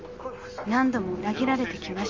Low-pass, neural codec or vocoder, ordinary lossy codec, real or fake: 7.2 kHz; none; Opus, 32 kbps; real